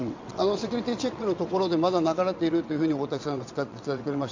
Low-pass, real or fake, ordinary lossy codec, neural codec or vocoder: 7.2 kHz; fake; MP3, 64 kbps; vocoder, 22.05 kHz, 80 mel bands, WaveNeXt